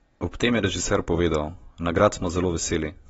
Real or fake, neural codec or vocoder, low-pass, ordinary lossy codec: real; none; 19.8 kHz; AAC, 24 kbps